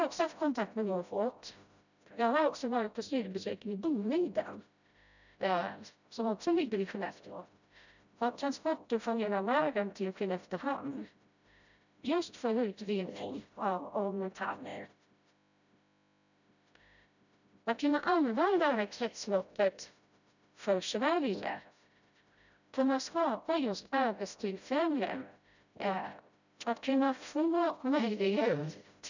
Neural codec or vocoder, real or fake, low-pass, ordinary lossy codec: codec, 16 kHz, 0.5 kbps, FreqCodec, smaller model; fake; 7.2 kHz; none